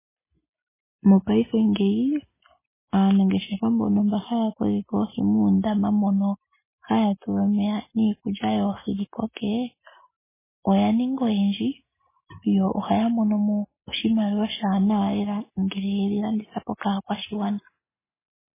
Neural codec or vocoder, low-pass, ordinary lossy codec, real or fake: none; 3.6 kHz; MP3, 16 kbps; real